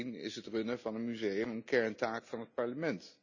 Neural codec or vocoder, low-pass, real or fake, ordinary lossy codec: none; 7.2 kHz; real; none